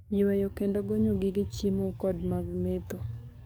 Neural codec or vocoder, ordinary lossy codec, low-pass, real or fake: codec, 44.1 kHz, 7.8 kbps, DAC; none; none; fake